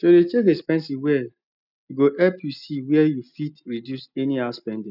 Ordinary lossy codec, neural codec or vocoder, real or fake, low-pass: none; none; real; 5.4 kHz